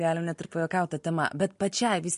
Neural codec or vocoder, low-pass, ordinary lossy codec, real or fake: none; 10.8 kHz; MP3, 48 kbps; real